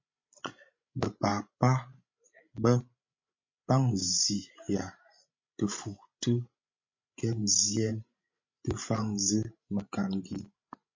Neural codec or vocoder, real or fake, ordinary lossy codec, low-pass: codec, 16 kHz, 16 kbps, FreqCodec, larger model; fake; MP3, 32 kbps; 7.2 kHz